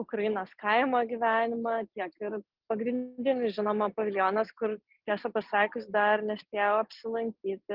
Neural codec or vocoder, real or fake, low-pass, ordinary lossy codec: none; real; 5.4 kHz; Opus, 24 kbps